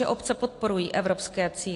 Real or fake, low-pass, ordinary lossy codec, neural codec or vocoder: real; 10.8 kHz; AAC, 48 kbps; none